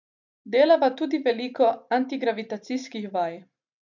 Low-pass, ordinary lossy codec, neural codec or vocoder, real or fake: 7.2 kHz; none; none; real